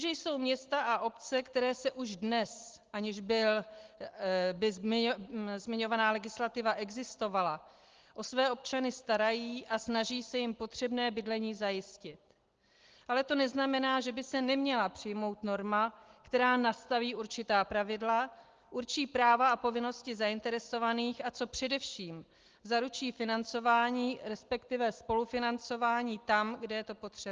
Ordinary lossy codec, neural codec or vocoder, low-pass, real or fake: Opus, 16 kbps; none; 7.2 kHz; real